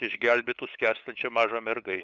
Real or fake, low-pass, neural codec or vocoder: fake; 7.2 kHz; codec, 16 kHz, 8 kbps, FunCodec, trained on LibriTTS, 25 frames a second